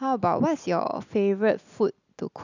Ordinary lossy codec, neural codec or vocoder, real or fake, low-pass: none; none; real; 7.2 kHz